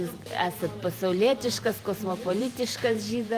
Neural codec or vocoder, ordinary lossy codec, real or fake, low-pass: vocoder, 44.1 kHz, 128 mel bands every 512 samples, BigVGAN v2; Opus, 32 kbps; fake; 14.4 kHz